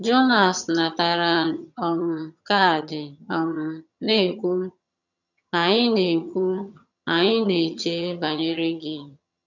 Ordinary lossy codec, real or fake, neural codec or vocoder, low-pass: none; fake; vocoder, 22.05 kHz, 80 mel bands, HiFi-GAN; 7.2 kHz